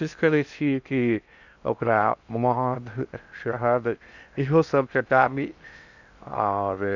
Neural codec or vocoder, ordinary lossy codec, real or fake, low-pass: codec, 16 kHz in and 24 kHz out, 0.6 kbps, FocalCodec, streaming, 2048 codes; none; fake; 7.2 kHz